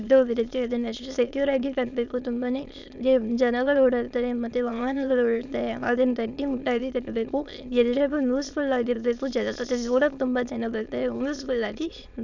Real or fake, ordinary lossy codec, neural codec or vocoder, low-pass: fake; none; autoencoder, 22.05 kHz, a latent of 192 numbers a frame, VITS, trained on many speakers; 7.2 kHz